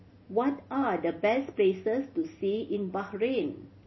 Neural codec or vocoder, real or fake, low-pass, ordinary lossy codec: none; real; 7.2 kHz; MP3, 24 kbps